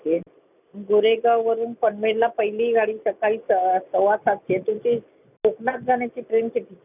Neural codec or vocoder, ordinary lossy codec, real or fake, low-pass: none; none; real; 3.6 kHz